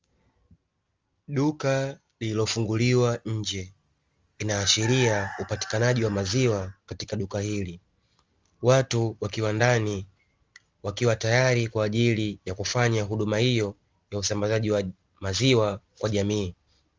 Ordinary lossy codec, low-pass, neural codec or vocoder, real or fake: Opus, 32 kbps; 7.2 kHz; none; real